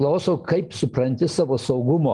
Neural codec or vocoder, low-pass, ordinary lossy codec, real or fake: none; 10.8 kHz; Opus, 24 kbps; real